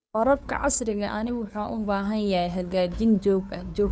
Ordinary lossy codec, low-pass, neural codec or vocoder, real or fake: none; none; codec, 16 kHz, 2 kbps, FunCodec, trained on Chinese and English, 25 frames a second; fake